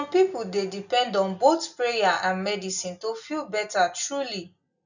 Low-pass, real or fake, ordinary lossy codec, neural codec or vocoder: 7.2 kHz; real; none; none